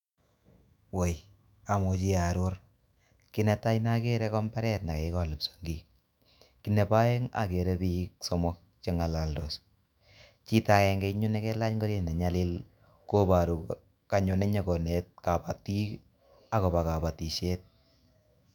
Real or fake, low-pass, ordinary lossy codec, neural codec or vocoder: fake; 19.8 kHz; none; autoencoder, 48 kHz, 128 numbers a frame, DAC-VAE, trained on Japanese speech